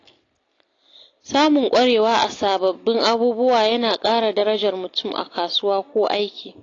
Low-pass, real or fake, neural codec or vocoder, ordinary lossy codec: 7.2 kHz; real; none; AAC, 32 kbps